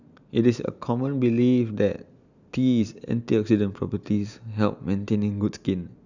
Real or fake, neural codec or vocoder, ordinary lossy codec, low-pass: real; none; none; 7.2 kHz